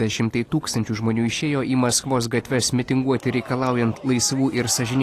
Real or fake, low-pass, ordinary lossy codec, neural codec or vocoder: real; 14.4 kHz; AAC, 48 kbps; none